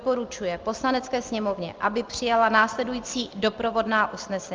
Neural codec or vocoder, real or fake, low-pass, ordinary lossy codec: none; real; 7.2 kHz; Opus, 32 kbps